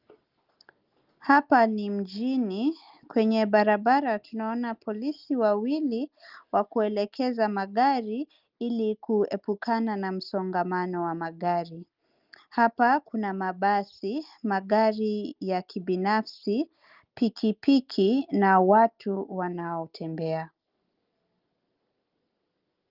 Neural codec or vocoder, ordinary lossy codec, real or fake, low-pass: none; Opus, 32 kbps; real; 5.4 kHz